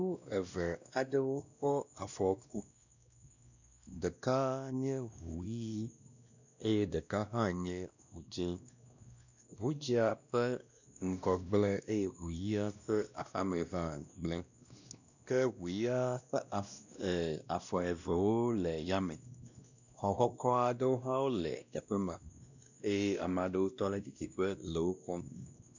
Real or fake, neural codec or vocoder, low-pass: fake; codec, 16 kHz, 1 kbps, X-Codec, WavLM features, trained on Multilingual LibriSpeech; 7.2 kHz